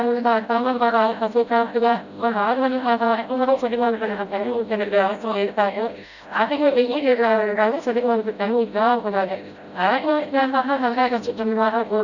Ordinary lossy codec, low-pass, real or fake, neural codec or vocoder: none; 7.2 kHz; fake; codec, 16 kHz, 0.5 kbps, FreqCodec, smaller model